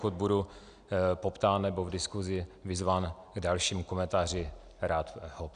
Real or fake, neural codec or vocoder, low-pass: real; none; 9.9 kHz